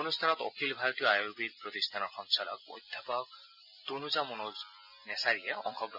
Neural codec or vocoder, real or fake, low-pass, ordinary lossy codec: none; real; 5.4 kHz; none